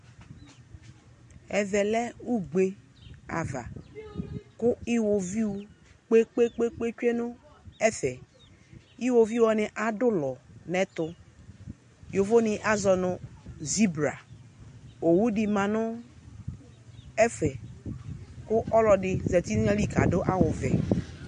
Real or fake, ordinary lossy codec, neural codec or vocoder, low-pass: real; MP3, 48 kbps; none; 9.9 kHz